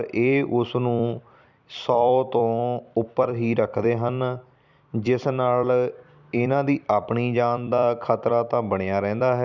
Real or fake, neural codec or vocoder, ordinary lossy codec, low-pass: fake; vocoder, 44.1 kHz, 128 mel bands every 256 samples, BigVGAN v2; none; 7.2 kHz